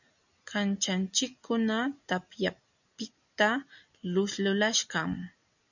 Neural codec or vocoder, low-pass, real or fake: none; 7.2 kHz; real